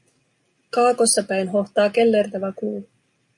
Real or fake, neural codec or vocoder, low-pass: real; none; 10.8 kHz